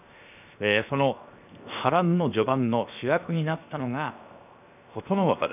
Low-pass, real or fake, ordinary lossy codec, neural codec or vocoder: 3.6 kHz; fake; none; codec, 16 kHz, 0.7 kbps, FocalCodec